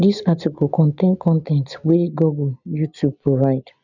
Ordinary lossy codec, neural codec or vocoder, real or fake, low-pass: none; none; real; 7.2 kHz